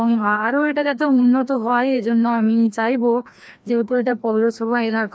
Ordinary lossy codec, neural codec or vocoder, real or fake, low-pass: none; codec, 16 kHz, 1 kbps, FreqCodec, larger model; fake; none